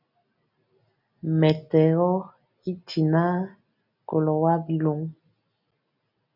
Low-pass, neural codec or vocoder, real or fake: 5.4 kHz; none; real